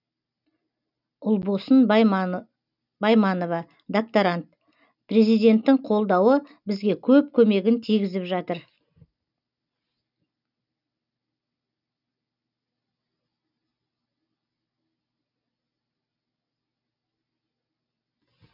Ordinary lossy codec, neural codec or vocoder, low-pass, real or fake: none; none; 5.4 kHz; real